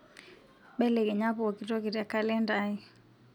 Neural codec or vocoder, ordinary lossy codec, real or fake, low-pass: none; none; real; 19.8 kHz